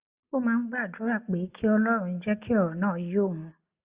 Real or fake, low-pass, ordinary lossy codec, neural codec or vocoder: real; 3.6 kHz; Opus, 64 kbps; none